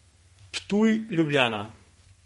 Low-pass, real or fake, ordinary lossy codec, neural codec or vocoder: 14.4 kHz; fake; MP3, 48 kbps; codec, 32 kHz, 1.9 kbps, SNAC